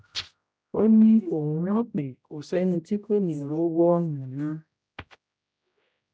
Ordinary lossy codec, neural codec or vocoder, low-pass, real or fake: none; codec, 16 kHz, 0.5 kbps, X-Codec, HuBERT features, trained on general audio; none; fake